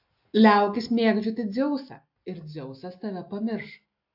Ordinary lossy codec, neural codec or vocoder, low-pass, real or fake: AAC, 48 kbps; none; 5.4 kHz; real